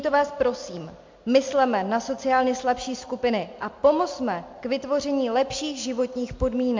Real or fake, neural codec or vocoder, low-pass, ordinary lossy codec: real; none; 7.2 kHz; MP3, 48 kbps